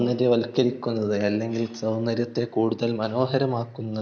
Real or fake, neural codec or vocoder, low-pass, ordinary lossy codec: real; none; none; none